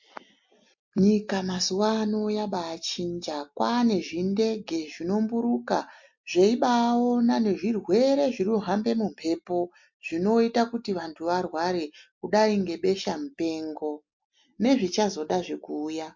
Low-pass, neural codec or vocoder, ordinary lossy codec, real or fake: 7.2 kHz; none; MP3, 48 kbps; real